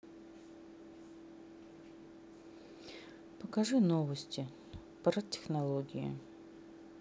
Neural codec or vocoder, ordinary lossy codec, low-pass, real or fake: none; none; none; real